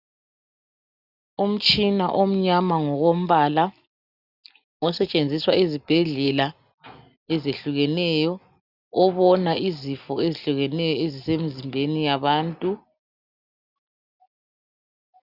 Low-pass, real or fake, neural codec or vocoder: 5.4 kHz; real; none